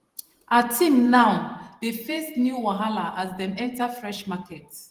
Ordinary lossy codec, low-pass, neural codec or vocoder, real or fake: Opus, 24 kbps; 14.4 kHz; vocoder, 48 kHz, 128 mel bands, Vocos; fake